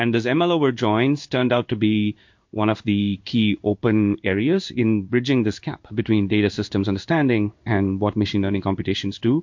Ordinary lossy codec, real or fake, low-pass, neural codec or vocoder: MP3, 48 kbps; fake; 7.2 kHz; codec, 16 kHz in and 24 kHz out, 1 kbps, XY-Tokenizer